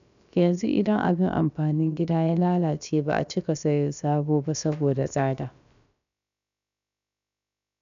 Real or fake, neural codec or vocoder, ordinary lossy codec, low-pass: fake; codec, 16 kHz, about 1 kbps, DyCAST, with the encoder's durations; MP3, 96 kbps; 7.2 kHz